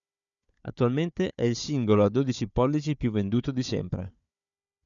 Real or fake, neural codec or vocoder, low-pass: fake; codec, 16 kHz, 4 kbps, FunCodec, trained on Chinese and English, 50 frames a second; 7.2 kHz